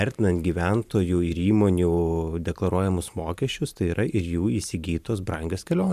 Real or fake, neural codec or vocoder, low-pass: fake; vocoder, 44.1 kHz, 128 mel bands every 512 samples, BigVGAN v2; 14.4 kHz